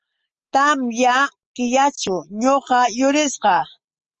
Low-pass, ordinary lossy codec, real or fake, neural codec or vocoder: 7.2 kHz; Opus, 24 kbps; real; none